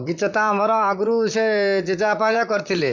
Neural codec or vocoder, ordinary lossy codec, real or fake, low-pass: vocoder, 44.1 kHz, 128 mel bands, Pupu-Vocoder; none; fake; 7.2 kHz